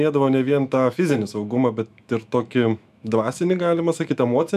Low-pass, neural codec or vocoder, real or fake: 14.4 kHz; autoencoder, 48 kHz, 128 numbers a frame, DAC-VAE, trained on Japanese speech; fake